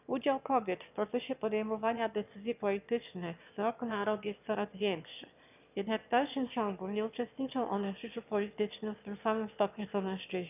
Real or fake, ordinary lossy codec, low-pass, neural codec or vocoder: fake; none; 3.6 kHz; autoencoder, 22.05 kHz, a latent of 192 numbers a frame, VITS, trained on one speaker